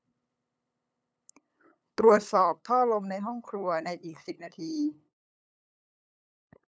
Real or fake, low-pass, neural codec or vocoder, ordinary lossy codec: fake; none; codec, 16 kHz, 8 kbps, FunCodec, trained on LibriTTS, 25 frames a second; none